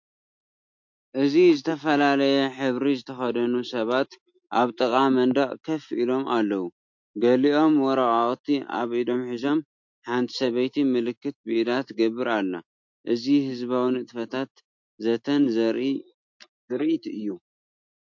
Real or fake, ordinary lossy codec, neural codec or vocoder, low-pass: real; MP3, 48 kbps; none; 7.2 kHz